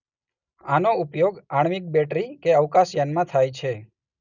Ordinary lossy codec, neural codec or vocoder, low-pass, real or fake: none; none; 7.2 kHz; real